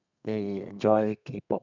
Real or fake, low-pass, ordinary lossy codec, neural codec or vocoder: fake; 7.2 kHz; none; codec, 32 kHz, 1.9 kbps, SNAC